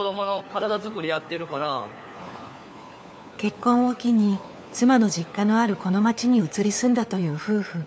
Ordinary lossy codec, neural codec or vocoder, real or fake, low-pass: none; codec, 16 kHz, 4 kbps, FunCodec, trained on LibriTTS, 50 frames a second; fake; none